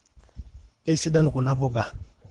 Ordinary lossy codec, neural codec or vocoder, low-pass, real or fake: Opus, 24 kbps; codec, 24 kHz, 3 kbps, HILCodec; 10.8 kHz; fake